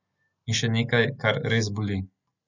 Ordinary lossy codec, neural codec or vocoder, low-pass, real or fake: none; none; 7.2 kHz; real